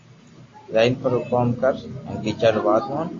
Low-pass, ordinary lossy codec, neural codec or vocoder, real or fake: 7.2 kHz; AAC, 32 kbps; none; real